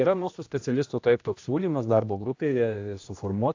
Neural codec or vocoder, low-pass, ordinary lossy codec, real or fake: codec, 16 kHz, 1 kbps, X-Codec, HuBERT features, trained on general audio; 7.2 kHz; AAC, 48 kbps; fake